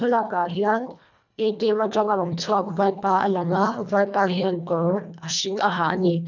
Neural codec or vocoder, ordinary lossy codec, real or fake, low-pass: codec, 24 kHz, 1.5 kbps, HILCodec; none; fake; 7.2 kHz